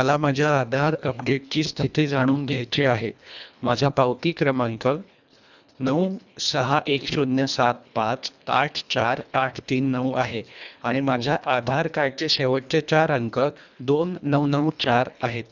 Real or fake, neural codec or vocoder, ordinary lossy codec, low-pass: fake; codec, 24 kHz, 1.5 kbps, HILCodec; none; 7.2 kHz